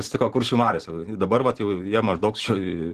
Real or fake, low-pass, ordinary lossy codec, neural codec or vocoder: fake; 14.4 kHz; Opus, 16 kbps; vocoder, 44.1 kHz, 128 mel bands every 512 samples, BigVGAN v2